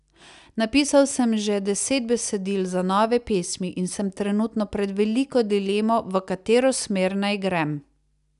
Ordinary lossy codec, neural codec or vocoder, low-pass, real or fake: none; none; 10.8 kHz; real